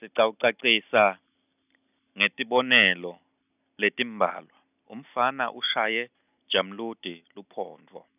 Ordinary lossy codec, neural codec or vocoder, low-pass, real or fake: none; none; 3.6 kHz; real